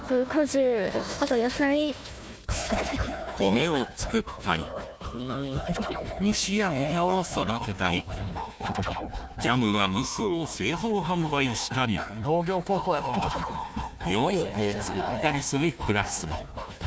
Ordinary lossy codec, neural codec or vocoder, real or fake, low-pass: none; codec, 16 kHz, 1 kbps, FunCodec, trained on Chinese and English, 50 frames a second; fake; none